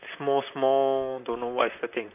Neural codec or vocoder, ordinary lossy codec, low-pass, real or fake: vocoder, 44.1 kHz, 128 mel bands, Pupu-Vocoder; none; 3.6 kHz; fake